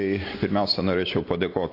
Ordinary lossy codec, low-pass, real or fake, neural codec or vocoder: AAC, 32 kbps; 5.4 kHz; real; none